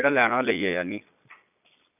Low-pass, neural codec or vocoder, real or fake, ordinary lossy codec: 3.6 kHz; vocoder, 22.05 kHz, 80 mel bands, Vocos; fake; none